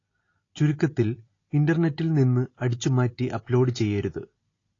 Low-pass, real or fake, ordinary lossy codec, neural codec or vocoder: 7.2 kHz; real; AAC, 32 kbps; none